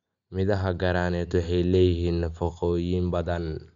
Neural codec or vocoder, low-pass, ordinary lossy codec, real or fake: none; 7.2 kHz; none; real